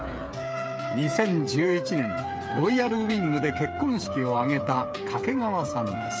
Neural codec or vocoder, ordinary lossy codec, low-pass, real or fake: codec, 16 kHz, 8 kbps, FreqCodec, smaller model; none; none; fake